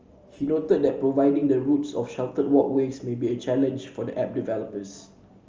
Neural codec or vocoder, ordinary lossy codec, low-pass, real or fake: none; Opus, 24 kbps; 7.2 kHz; real